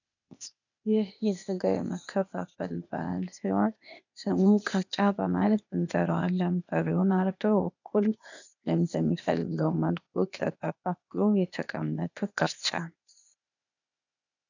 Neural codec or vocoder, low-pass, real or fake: codec, 16 kHz, 0.8 kbps, ZipCodec; 7.2 kHz; fake